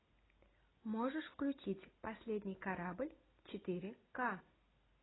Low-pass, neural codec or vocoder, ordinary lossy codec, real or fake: 7.2 kHz; none; AAC, 16 kbps; real